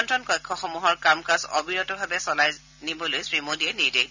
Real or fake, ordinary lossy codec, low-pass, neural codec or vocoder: real; none; 7.2 kHz; none